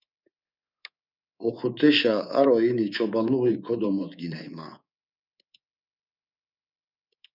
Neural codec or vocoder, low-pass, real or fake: codec, 24 kHz, 3.1 kbps, DualCodec; 5.4 kHz; fake